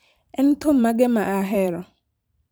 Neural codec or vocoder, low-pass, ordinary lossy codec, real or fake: vocoder, 44.1 kHz, 128 mel bands every 512 samples, BigVGAN v2; none; none; fake